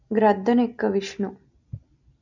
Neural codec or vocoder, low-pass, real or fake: none; 7.2 kHz; real